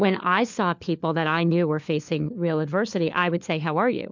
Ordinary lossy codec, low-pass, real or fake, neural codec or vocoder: MP3, 64 kbps; 7.2 kHz; fake; codec, 16 kHz, 4 kbps, FunCodec, trained on LibriTTS, 50 frames a second